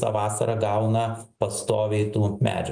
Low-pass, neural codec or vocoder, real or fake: 9.9 kHz; none; real